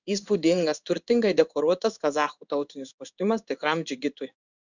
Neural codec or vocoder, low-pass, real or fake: codec, 16 kHz in and 24 kHz out, 1 kbps, XY-Tokenizer; 7.2 kHz; fake